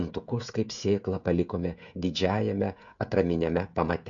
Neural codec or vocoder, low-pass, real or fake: codec, 16 kHz, 16 kbps, FreqCodec, smaller model; 7.2 kHz; fake